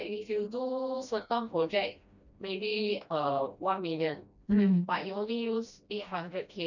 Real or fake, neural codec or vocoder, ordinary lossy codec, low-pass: fake; codec, 16 kHz, 1 kbps, FreqCodec, smaller model; none; 7.2 kHz